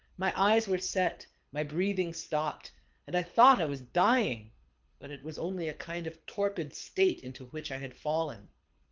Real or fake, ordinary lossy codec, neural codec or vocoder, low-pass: fake; Opus, 32 kbps; codec, 24 kHz, 6 kbps, HILCodec; 7.2 kHz